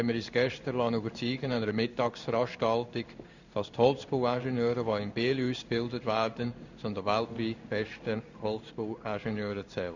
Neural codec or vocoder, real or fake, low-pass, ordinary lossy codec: codec, 16 kHz in and 24 kHz out, 1 kbps, XY-Tokenizer; fake; 7.2 kHz; none